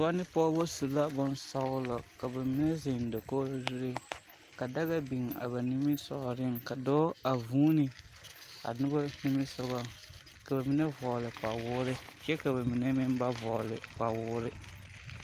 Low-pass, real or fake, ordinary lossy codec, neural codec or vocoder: 14.4 kHz; real; Opus, 32 kbps; none